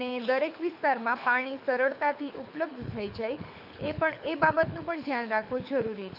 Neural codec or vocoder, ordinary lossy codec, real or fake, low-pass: codec, 24 kHz, 6 kbps, HILCodec; AAC, 48 kbps; fake; 5.4 kHz